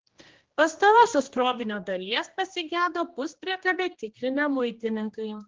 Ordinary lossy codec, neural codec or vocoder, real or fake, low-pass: Opus, 16 kbps; codec, 16 kHz, 1 kbps, X-Codec, HuBERT features, trained on general audio; fake; 7.2 kHz